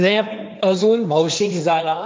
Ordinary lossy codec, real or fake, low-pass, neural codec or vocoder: none; fake; none; codec, 16 kHz, 1.1 kbps, Voila-Tokenizer